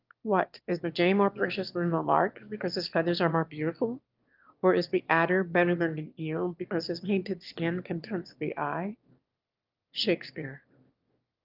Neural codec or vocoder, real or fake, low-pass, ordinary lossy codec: autoencoder, 22.05 kHz, a latent of 192 numbers a frame, VITS, trained on one speaker; fake; 5.4 kHz; Opus, 24 kbps